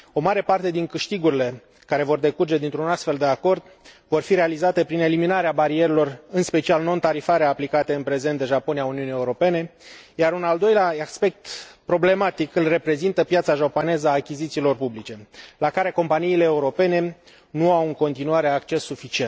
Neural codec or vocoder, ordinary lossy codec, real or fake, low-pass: none; none; real; none